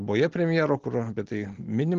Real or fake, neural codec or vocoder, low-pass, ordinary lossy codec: real; none; 7.2 kHz; Opus, 16 kbps